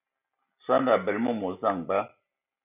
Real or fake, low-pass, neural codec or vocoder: real; 3.6 kHz; none